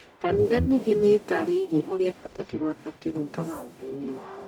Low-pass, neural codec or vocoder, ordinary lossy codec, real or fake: 19.8 kHz; codec, 44.1 kHz, 0.9 kbps, DAC; none; fake